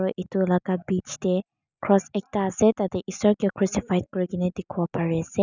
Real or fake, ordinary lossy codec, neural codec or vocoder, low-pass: real; none; none; 7.2 kHz